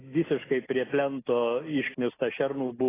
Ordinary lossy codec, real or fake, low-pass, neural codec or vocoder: AAC, 16 kbps; real; 3.6 kHz; none